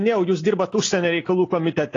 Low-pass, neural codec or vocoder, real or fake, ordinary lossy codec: 7.2 kHz; none; real; AAC, 32 kbps